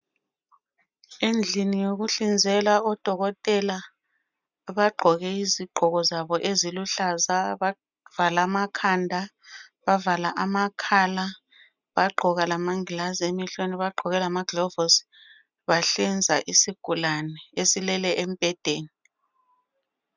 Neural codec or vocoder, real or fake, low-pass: none; real; 7.2 kHz